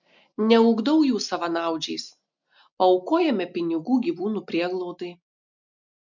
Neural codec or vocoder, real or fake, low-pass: none; real; 7.2 kHz